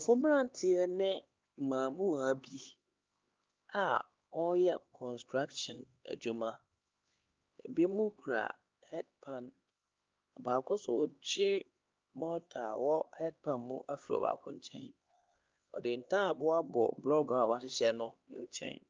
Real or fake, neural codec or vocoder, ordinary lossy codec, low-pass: fake; codec, 16 kHz, 2 kbps, X-Codec, HuBERT features, trained on LibriSpeech; Opus, 24 kbps; 7.2 kHz